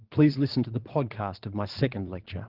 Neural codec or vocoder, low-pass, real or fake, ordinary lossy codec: vocoder, 22.05 kHz, 80 mel bands, Vocos; 5.4 kHz; fake; Opus, 16 kbps